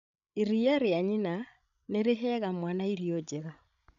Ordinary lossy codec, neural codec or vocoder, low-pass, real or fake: none; codec, 16 kHz, 16 kbps, FreqCodec, larger model; 7.2 kHz; fake